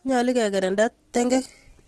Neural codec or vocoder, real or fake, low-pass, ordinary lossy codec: none; real; 10.8 kHz; Opus, 16 kbps